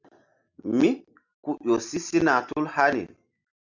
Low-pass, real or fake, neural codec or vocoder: 7.2 kHz; real; none